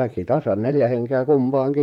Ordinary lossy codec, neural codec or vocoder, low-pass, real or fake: MP3, 96 kbps; vocoder, 44.1 kHz, 128 mel bands, Pupu-Vocoder; 19.8 kHz; fake